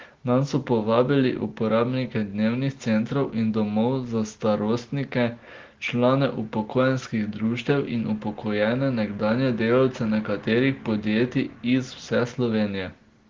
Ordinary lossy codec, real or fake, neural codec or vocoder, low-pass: Opus, 16 kbps; real; none; 7.2 kHz